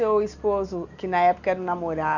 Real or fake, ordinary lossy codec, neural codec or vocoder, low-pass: real; none; none; 7.2 kHz